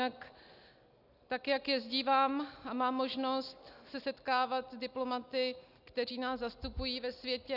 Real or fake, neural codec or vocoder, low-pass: real; none; 5.4 kHz